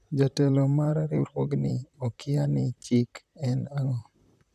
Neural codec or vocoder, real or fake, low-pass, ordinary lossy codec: vocoder, 44.1 kHz, 128 mel bands, Pupu-Vocoder; fake; 14.4 kHz; none